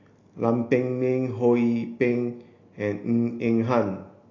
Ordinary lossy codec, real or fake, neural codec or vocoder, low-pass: AAC, 32 kbps; real; none; 7.2 kHz